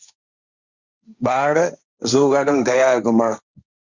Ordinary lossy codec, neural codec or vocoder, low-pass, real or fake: Opus, 64 kbps; codec, 16 kHz, 1.1 kbps, Voila-Tokenizer; 7.2 kHz; fake